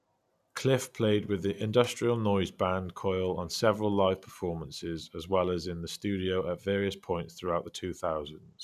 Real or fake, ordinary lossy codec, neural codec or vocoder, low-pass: real; none; none; 14.4 kHz